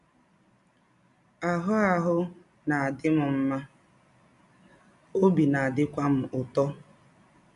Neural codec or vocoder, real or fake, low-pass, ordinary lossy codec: none; real; 10.8 kHz; none